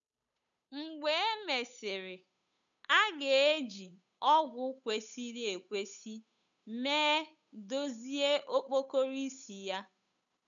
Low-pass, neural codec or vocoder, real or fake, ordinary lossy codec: 7.2 kHz; codec, 16 kHz, 8 kbps, FunCodec, trained on Chinese and English, 25 frames a second; fake; none